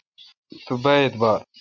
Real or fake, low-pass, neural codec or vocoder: real; 7.2 kHz; none